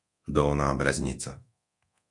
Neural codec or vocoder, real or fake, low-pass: codec, 24 kHz, 0.9 kbps, DualCodec; fake; 10.8 kHz